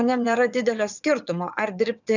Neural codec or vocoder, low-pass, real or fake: vocoder, 22.05 kHz, 80 mel bands, WaveNeXt; 7.2 kHz; fake